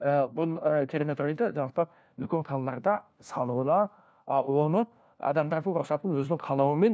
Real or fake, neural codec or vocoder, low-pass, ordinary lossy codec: fake; codec, 16 kHz, 1 kbps, FunCodec, trained on LibriTTS, 50 frames a second; none; none